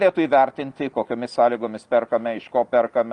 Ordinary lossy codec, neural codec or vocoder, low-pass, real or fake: Opus, 32 kbps; none; 10.8 kHz; real